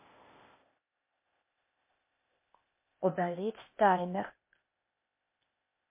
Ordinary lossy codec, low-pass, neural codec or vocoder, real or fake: MP3, 24 kbps; 3.6 kHz; codec, 16 kHz, 0.8 kbps, ZipCodec; fake